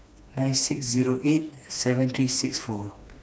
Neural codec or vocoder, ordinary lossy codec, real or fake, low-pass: codec, 16 kHz, 2 kbps, FreqCodec, smaller model; none; fake; none